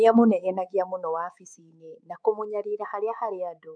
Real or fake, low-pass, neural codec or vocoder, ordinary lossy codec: fake; 9.9 kHz; codec, 24 kHz, 3.1 kbps, DualCodec; none